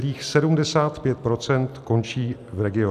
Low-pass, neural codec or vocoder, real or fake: 14.4 kHz; none; real